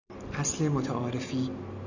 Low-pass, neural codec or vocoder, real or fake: 7.2 kHz; none; real